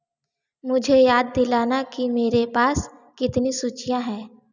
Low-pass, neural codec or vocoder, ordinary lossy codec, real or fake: 7.2 kHz; none; none; real